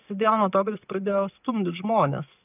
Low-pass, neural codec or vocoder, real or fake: 3.6 kHz; none; real